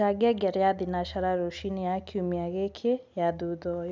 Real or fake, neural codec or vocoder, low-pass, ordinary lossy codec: real; none; none; none